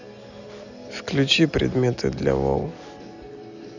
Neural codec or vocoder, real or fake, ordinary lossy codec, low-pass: none; real; none; 7.2 kHz